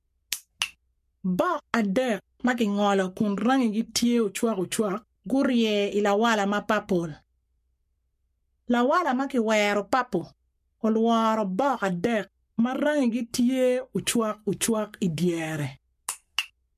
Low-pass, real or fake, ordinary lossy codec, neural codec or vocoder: 14.4 kHz; fake; MP3, 64 kbps; codec, 44.1 kHz, 7.8 kbps, Pupu-Codec